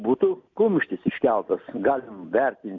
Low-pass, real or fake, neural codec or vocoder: 7.2 kHz; real; none